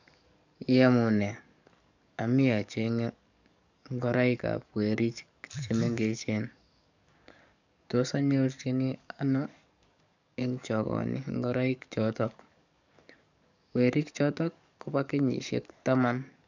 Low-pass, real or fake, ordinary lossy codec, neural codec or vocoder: 7.2 kHz; fake; none; codec, 44.1 kHz, 7.8 kbps, DAC